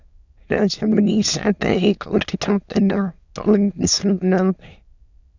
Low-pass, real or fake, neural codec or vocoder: 7.2 kHz; fake; autoencoder, 22.05 kHz, a latent of 192 numbers a frame, VITS, trained on many speakers